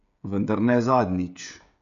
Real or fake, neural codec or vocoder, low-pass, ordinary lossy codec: fake; codec, 16 kHz, 16 kbps, FreqCodec, smaller model; 7.2 kHz; none